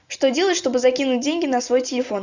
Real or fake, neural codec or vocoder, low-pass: real; none; 7.2 kHz